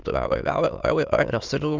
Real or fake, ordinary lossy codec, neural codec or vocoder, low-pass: fake; Opus, 32 kbps; autoencoder, 22.05 kHz, a latent of 192 numbers a frame, VITS, trained on many speakers; 7.2 kHz